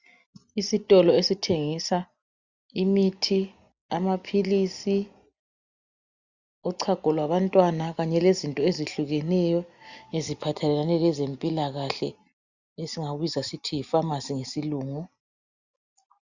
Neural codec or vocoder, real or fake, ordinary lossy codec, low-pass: none; real; Opus, 64 kbps; 7.2 kHz